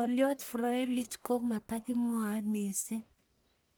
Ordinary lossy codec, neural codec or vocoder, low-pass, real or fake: none; codec, 44.1 kHz, 1.7 kbps, Pupu-Codec; none; fake